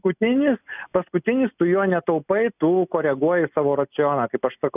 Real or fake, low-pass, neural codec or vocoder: real; 3.6 kHz; none